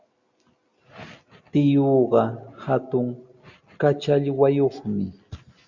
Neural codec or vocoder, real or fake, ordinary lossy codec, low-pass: none; real; Opus, 64 kbps; 7.2 kHz